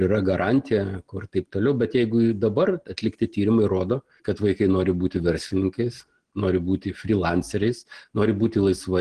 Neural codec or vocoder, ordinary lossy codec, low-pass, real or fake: none; Opus, 16 kbps; 9.9 kHz; real